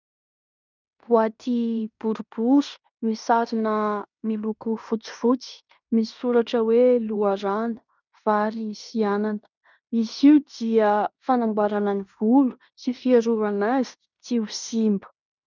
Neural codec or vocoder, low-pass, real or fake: codec, 16 kHz in and 24 kHz out, 0.9 kbps, LongCat-Audio-Codec, fine tuned four codebook decoder; 7.2 kHz; fake